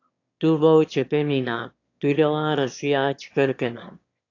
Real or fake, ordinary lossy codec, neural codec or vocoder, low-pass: fake; AAC, 48 kbps; autoencoder, 22.05 kHz, a latent of 192 numbers a frame, VITS, trained on one speaker; 7.2 kHz